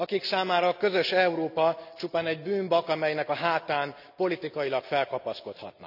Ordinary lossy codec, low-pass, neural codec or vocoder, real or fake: none; 5.4 kHz; none; real